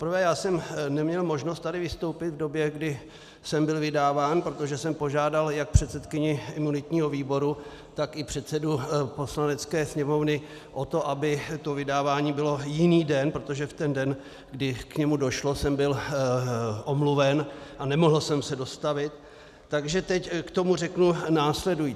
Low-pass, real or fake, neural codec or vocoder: 14.4 kHz; real; none